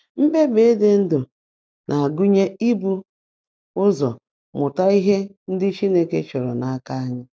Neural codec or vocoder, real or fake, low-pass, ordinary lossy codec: none; real; none; none